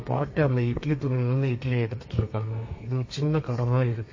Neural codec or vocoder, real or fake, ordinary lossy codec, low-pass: codec, 32 kHz, 1.9 kbps, SNAC; fake; MP3, 32 kbps; 7.2 kHz